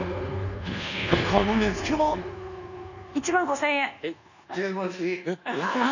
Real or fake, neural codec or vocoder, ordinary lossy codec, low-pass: fake; codec, 24 kHz, 1.2 kbps, DualCodec; none; 7.2 kHz